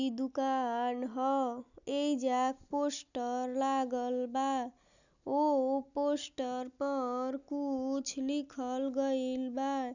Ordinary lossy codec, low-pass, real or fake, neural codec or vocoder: none; 7.2 kHz; real; none